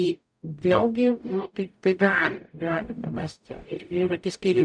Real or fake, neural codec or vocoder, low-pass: fake; codec, 44.1 kHz, 0.9 kbps, DAC; 9.9 kHz